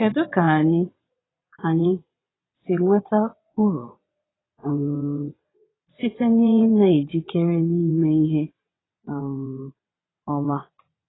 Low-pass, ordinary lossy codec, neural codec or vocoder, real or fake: 7.2 kHz; AAC, 16 kbps; vocoder, 22.05 kHz, 80 mel bands, WaveNeXt; fake